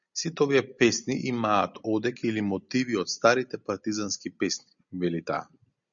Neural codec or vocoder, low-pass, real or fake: none; 7.2 kHz; real